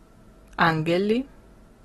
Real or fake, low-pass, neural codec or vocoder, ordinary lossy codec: real; 19.8 kHz; none; AAC, 32 kbps